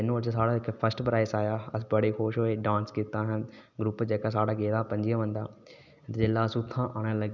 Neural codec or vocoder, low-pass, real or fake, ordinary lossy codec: none; 7.2 kHz; real; none